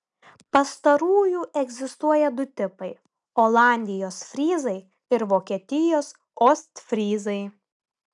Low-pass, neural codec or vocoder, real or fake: 10.8 kHz; none; real